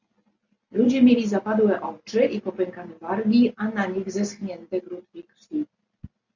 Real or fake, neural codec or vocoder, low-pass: real; none; 7.2 kHz